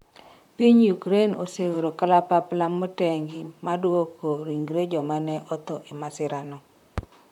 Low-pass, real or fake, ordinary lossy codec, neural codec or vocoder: 19.8 kHz; fake; none; vocoder, 44.1 kHz, 128 mel bands, Pupu-Vocoder